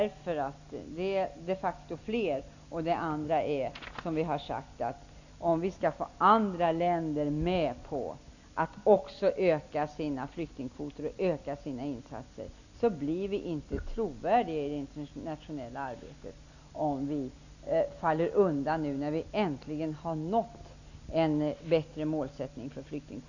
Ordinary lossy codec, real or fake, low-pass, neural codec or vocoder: none; real; 7.2 kHz; none